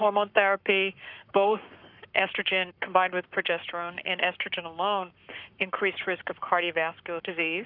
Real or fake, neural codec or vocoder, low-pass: fake; codec, 44.1 kHz, 7.8 kbps, Pupu-Codec; 5.4 kHz